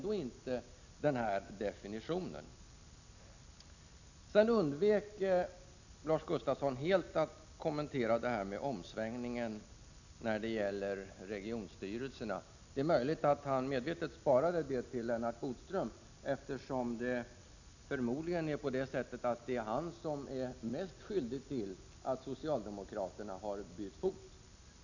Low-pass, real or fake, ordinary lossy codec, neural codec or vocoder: 7.2 kHz; real; none; none